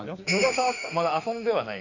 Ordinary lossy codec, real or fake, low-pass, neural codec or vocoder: none; fake; 7.2 kHz; autoencoder, 48 kHz, 32 numbers a frame, DAC-VAE, trained on Japanese speech